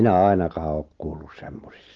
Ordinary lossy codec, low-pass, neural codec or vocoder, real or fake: Opus, 16 kbps; 7.2 kHz; none; real